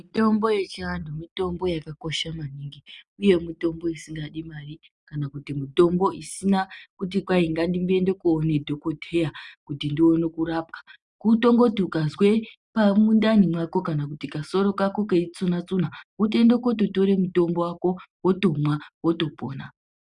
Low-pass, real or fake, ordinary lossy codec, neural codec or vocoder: 10.8 kHz; real; Opus, 64 kbps; none